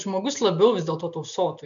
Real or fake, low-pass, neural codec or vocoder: real; 7.2 kHz; none